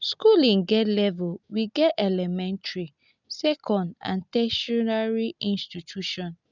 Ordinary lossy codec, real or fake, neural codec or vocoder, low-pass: none; real; none; 7.2 kHz